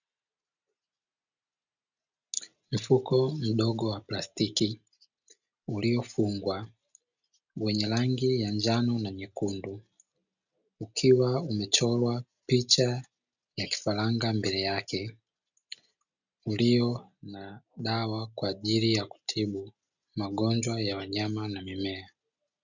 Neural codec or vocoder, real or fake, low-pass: none; real; 7.2 kHz